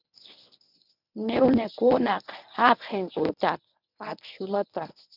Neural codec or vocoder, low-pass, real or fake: codec, 24 kHz, 0.9 kbps, WavTokenizer, medium speech release version 1; 5.4 kHz; fake